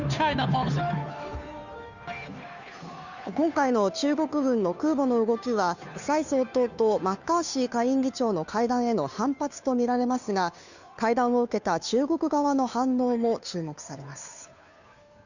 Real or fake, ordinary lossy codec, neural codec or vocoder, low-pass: fake; none; codec, 16 kHz, 2 kbps, FunCodec, trained on Chinese and English, 25 frames a second; 7.2 kHz